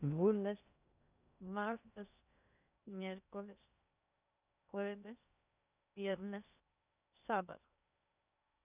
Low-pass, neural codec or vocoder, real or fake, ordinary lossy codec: 3.6 kHz; codec, 16 kHz in and 24 kHz out, 0.6 kbps, FocalCodec, streaming, 2048 codes; fake; none